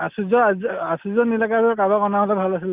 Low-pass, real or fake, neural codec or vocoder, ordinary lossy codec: 3.6 kHz; real; none; Opus, 32 kbps